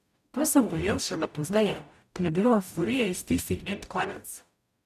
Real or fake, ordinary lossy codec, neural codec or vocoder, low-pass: fake; none; codec, 44.1 kHz, 0.9 kbps, DAC; 14.4 kHz